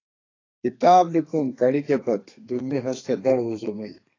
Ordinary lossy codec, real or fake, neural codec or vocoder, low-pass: AAC, 32 kbps; fake; codec, 32 kHz, 1.9 kbps, SNAC; 7.2 kHz